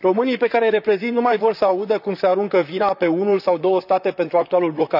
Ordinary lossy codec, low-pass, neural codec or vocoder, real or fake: none; 5.4 kHz; vocoder, 44.1 kHz, 128 mel bands, Pupu-Vocoder; fake